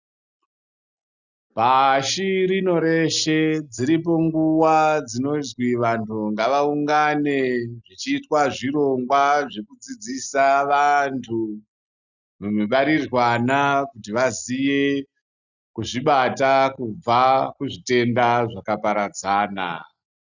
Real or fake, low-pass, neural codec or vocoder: real; 7.2 kHz; none